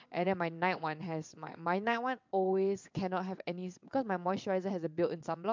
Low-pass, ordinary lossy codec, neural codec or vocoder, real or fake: 7.2 kHz; MP3, 64 kbps; vocoder, 22.05 kHz, 80 mel bands, WaveNeXt; fake